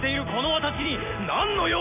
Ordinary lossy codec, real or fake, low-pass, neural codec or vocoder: AAC, 24 kbps; real; 3.6 kHz; none